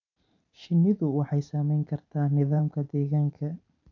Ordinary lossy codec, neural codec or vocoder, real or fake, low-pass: none; vocoder, 44.1 kHz, 128 mel bands every 512 samples, BigVGAN v2; fake; 7.2 kHz